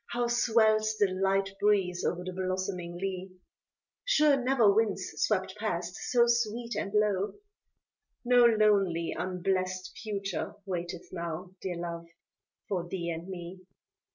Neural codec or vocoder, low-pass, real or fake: none; 7.2 kHz; real